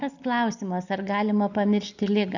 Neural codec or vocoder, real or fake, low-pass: none; real; 7.2 kHz